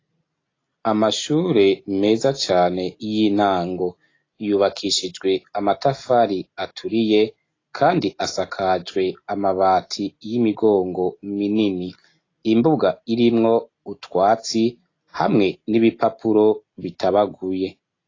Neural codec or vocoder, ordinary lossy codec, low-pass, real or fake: none; AAC, 32 kbps; 7.2 kHz; real